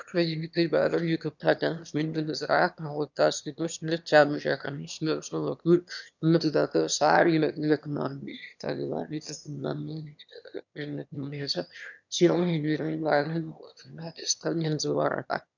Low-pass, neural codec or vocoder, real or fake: 7.2 kHz; autoencoder, 22.05 kHz, a latent of 192 numbers a frame, VITS, trained on one speaker; fake